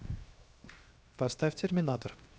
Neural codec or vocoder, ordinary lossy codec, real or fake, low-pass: codec, 16 kHz, 0.7 kbps, FocalCodec; none; fake; none